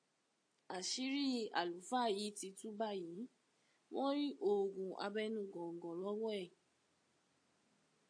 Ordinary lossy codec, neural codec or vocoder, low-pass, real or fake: AAC, 48 kbps; none; 9.9 kHz; real